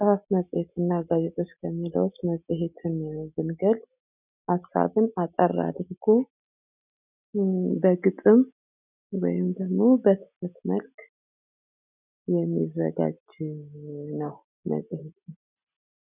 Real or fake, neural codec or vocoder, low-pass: real; none; 3.6 kHz